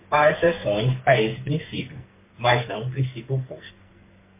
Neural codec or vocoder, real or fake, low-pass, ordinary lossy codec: codec, 44.1 kHz, 2.6 kbps, SNAC; fake; 3.6 kHz; MP3, 24 kbps